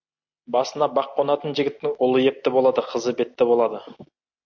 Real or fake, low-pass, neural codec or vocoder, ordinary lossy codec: real; 7.2 kHz; none; MP3, 48 kbps